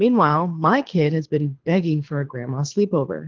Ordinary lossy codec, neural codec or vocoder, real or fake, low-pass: Opus, 16 kbps; codec, 24 kHz, 6 kbps, HILCodec; fake; 7.2 kHz